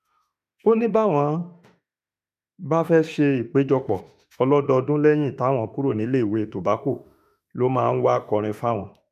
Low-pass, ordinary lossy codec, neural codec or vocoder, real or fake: 14.4 kHz; none; autoencoder, 48 kHz, 32 numbers a frame, DAC-VAE, trained on Japanese speech; fake